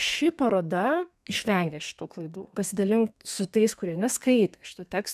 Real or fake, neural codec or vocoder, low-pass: fake; codec, 32 kHz, 1.9 kbps, SNAC; 14.4 kHz